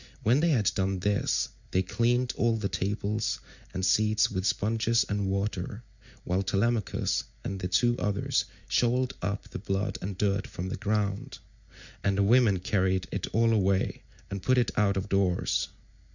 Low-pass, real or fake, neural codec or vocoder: 7.2 kHz; real; none